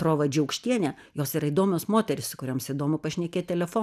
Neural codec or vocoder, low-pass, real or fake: none; 14.4 kHz; real